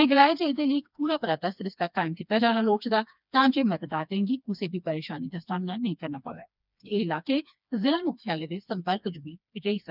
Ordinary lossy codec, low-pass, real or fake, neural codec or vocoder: none; 5.4 kHz; fake; codec, 16 kHz, 2 kbps, FreqCodec, smaller model